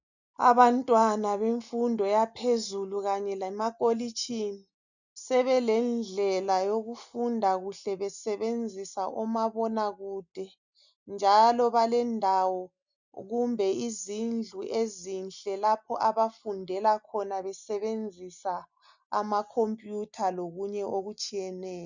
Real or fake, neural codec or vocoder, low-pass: real; none; 7.2 kHz